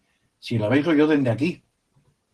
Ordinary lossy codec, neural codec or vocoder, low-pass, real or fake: Opus, 16 kbps; none; 10.8 kHz; real